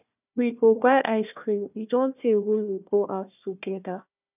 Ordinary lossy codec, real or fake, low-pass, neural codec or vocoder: none; fake; 3.6 kHz; codec, 16 kHz, 1 kbps, FunCodec, trained on Chinese and English, 50 frames a second